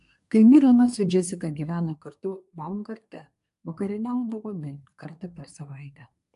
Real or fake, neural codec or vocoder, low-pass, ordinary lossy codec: fake; codec, 24 kHz, 1 kbps, SNAC; 10.8 kHz; AAC, 64 kbps